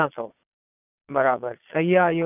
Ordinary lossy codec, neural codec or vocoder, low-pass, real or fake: none; vocoder, 22.05 kHz, 80 mel bands, Vocos; 3.6 kHz; fake